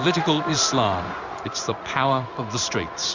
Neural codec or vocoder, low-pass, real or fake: codec, 16 kHz in and 24 kHz out, 1 kbps, XY-Tokenizer; 7.2 kHz; fake